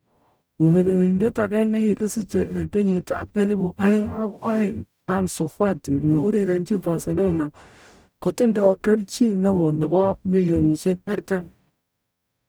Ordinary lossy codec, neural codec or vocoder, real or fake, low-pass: none; codec, 44.1 kHz, 0.9 kbps, DAC; fake; none